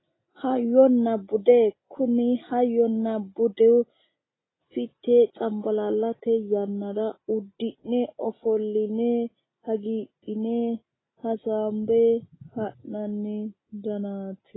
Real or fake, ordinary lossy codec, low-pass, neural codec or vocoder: real; AAC, 16 kbps; 7.2 kHz; none